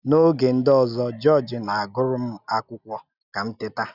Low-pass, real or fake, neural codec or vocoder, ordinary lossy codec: 5.4 kHz; real; none; none